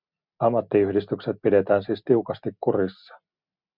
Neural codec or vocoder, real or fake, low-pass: none; real; 5.4 kHz